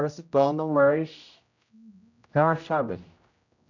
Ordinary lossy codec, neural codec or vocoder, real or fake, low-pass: none; codec, 16 kHz, 0.5 kbps, X-Codec, HuBERT features, trained on general audio; fake; 7.2 kHz